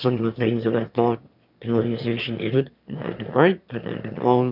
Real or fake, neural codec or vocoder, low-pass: fake; autoencoder, 22.05 kHz, a latent of 192 numbers a frame, VITS, trained on one speaker; 5.4 kHz